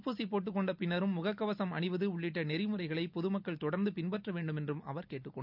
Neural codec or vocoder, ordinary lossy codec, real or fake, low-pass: none; none; real; 5.4 kHz